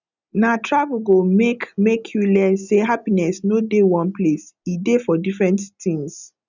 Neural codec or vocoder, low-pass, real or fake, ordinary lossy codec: none; 7.2 kHz; real; none